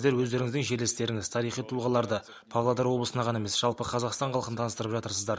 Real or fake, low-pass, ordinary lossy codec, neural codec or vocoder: real; none; none; none